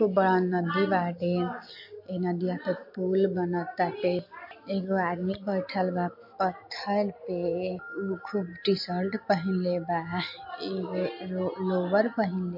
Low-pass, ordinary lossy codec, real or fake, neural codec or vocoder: 5.4 kHz; MP3, 32 kbps; real; none